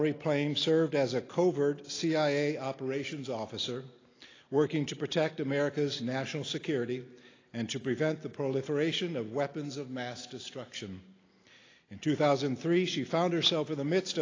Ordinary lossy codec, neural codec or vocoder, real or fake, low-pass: AAC, 32 kbps; none; real; 7.2 kHz